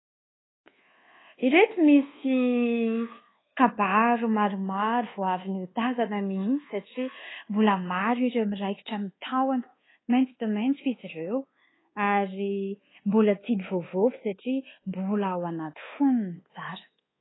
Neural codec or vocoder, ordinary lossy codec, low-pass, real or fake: codec, 24 kHz, 1.2 kbps, DualCodec; AAC, 16 kbps; 7.2 kHz; fake